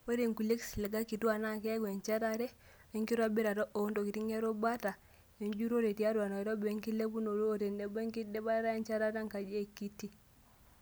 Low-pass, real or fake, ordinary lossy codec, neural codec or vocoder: none; real; none; none